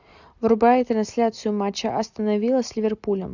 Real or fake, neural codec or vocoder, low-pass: real; none; 7.2 kHz